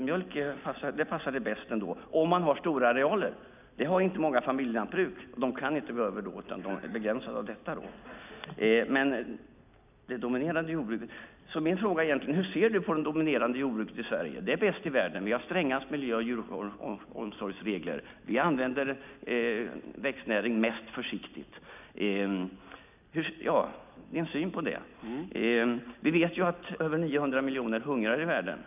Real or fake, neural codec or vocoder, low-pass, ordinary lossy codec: real; none; 3.6 kHz; none